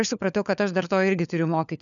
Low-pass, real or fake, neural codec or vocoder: 7.2 kHz; fake; codec, 16 kHz, 4 kbps, FunCodec, trained on LibriTTS, 50 frames a second